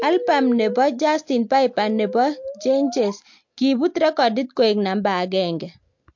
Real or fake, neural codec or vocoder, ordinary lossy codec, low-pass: real; none; MP3, 48 kbps; 7.2 kHz